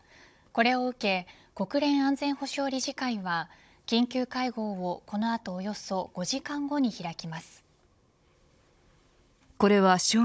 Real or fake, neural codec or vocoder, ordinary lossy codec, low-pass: fake; codec, 16 kHz, 16 kbps, FunCodec, trained on Chinese and English, 50 frames a second; none; none